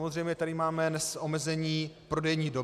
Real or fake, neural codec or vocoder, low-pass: real; none; 14.4 kHz